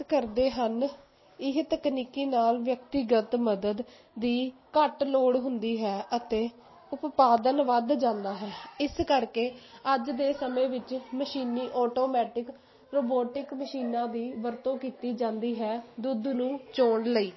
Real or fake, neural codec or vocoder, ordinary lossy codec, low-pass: real; none; MP3, 24 kbps; 7.2 kHz